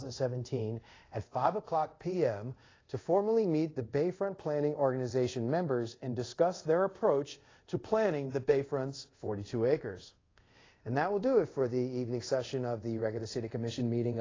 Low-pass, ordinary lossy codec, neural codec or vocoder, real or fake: 7.2 kHz; AAC, 32 kbps; codec, 24 kHz, 0.5 kbps, DualCodec; fake